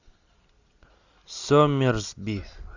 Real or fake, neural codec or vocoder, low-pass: real; none; 7.2 kHz